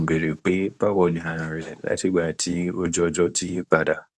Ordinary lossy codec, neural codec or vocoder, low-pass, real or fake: none; codec, 24 kHz, 0.9 kbps, WavTokenizer, medium speech release version 2; none; fake